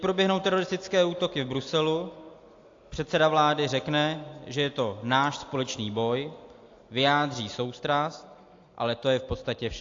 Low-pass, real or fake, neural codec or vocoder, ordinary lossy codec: 7.2 kHz; real; none; AAC, 48 kbps